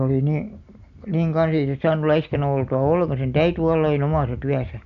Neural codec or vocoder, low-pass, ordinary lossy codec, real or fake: none; 7.2 kHz; none; real